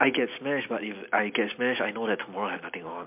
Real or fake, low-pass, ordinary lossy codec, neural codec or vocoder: real; 3.6 kHz; MP3, 32 kbps; none